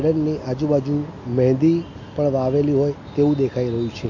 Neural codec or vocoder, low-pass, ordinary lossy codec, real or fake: none; 7.2 kHz; MP3, 48 kbps; real